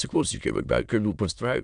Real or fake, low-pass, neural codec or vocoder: fake; 9.9 kHz; autoencoder, 22.05 kHz, a latent of 192 numbers a frame, VITS, trained on many speakers